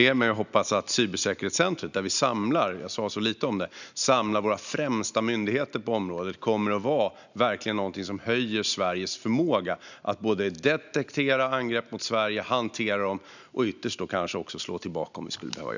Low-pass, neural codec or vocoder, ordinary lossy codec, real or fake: 7.2 kHz; none; none; real